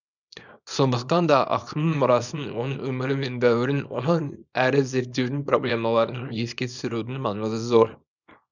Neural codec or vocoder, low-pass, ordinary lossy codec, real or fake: codec, 24 kHz, 0.9 kbps, WavTokenizer, small release; 7.2 kHz; none; fake